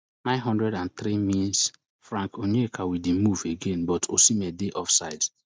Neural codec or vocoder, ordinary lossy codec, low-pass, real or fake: none; none; none; real